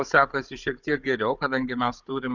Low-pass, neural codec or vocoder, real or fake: 7.2 kHz; codec, 16 kHz, 16 kbps, FunCodec, trained on Chinese and English, 50 frames a second; fake